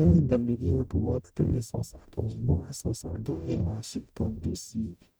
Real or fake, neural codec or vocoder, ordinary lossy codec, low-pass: fake; codec, 44.1 kHz, 0.9 kbps, DAC; none; none